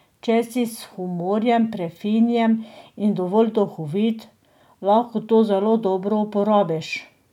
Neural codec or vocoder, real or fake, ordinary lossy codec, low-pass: none; real; none; 19.8 kHz